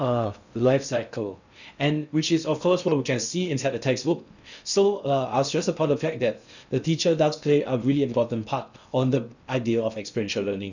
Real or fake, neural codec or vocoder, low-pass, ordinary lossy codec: fake; codec, 16 kHz in and 24 kHz out, 0.6 kbps, FocalCodec, streaming, 2048 codes; 7.2 kHz; none